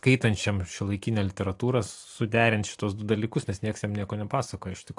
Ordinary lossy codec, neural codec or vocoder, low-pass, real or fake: AAC, 64 kbps; none; 10.8 kHz; real